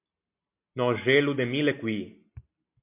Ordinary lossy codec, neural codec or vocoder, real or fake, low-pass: MP3, 32 kbps; none; real; 3.6 kHz